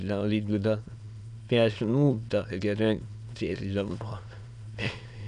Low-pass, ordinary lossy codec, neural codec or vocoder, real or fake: 9.9 kHz; none; autoencoder, 22.05 kHz, a latent of 192 numbers a frame, VITS, trained on many speakers; fake